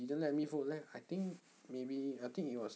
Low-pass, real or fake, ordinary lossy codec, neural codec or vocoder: none; real; none; none